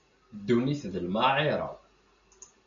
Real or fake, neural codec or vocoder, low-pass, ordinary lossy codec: real; none; 7.2 kHz; AAC, 96 kbps